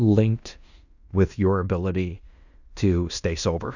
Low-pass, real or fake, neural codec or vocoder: 7.2 kHz; fake; codec, 16 kHz in and 24 kHz out, 0.9 kbps, LongCat-Audio-Codec, fine tuned four codebook decoder